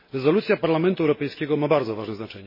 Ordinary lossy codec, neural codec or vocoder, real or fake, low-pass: MP3, 24 kbps; none; real; 5.4 kHz